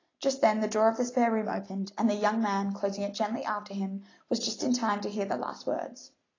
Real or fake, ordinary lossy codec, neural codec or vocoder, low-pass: real; AAC, 32 kbps; none; 7.2 kHz